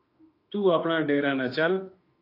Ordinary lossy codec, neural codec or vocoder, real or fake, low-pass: AAC, 32 kbps; autoencoder, 48 kHz, 32 numbers a frame, DAC-VAE, trained on Japanese speech; fake; 5.4 kHz